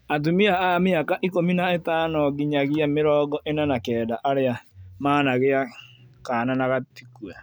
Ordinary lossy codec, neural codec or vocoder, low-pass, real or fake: none; none; none; real